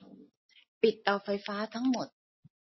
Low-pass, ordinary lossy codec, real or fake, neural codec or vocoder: 7.2 kHz; MP3, 24 kbps; real; none